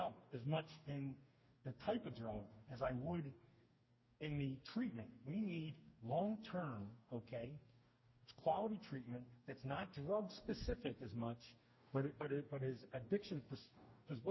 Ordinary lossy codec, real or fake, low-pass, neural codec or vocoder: MP3, 24 kbps; fake; 7.2 kHz; codec, 44.1 kHz, 2.6 kbps, DAC